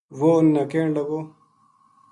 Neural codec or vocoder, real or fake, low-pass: none; real; 10.8 kHz